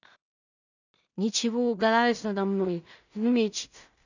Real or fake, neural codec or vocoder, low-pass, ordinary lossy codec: fake; codec, 16 kHz in and 24 kHz out, 0.4 kbps, LongCat-Audio-Codec, two codebook decoder; 7.2 kHz; none